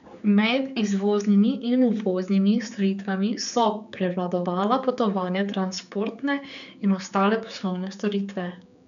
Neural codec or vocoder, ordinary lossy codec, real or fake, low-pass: codec, 16 kHz, 4 kbps, X-Codec, HuBERT features, trained on general audio; none; fake; 7.2 kHz